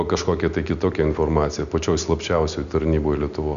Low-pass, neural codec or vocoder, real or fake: 7.2 kHz; none; real